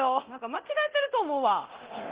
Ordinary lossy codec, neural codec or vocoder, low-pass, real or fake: Opus, 16 kbps; codec, 24 kHz, 0.9 kbps, DualCodec; 3.6 kHz; fake